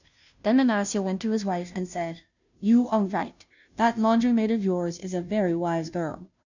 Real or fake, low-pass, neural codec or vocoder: fake; 7.2 kHz; codec, 16 kHz, 0.5 kbps, FunCodec, trained on Chinese and English, 25 frames a second